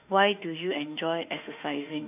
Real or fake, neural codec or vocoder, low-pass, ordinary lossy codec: fake; autoencoder, 48 kHz, 32 numbers a frame, DAC-VAE, trained on Japanese speech; 3.6 kHz; none